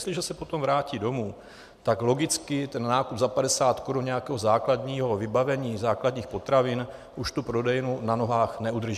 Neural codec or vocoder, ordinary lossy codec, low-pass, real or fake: none; MP3, 96 kbps; 14.4 kHz; real